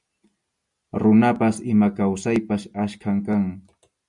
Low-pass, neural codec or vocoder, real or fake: 10.8 kHz; none; real